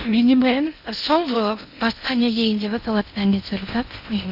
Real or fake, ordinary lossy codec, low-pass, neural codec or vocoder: fake; none; 5.4 kHz; codec, 16 kHz in and 24 kHz out, 0.6 kbps, FocalCodec, streaming, 2048 codes